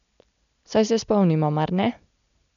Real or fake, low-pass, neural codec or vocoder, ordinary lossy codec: real; 7.2 kHz; none; none